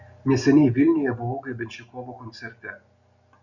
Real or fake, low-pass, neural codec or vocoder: real; 7.2 kHz; none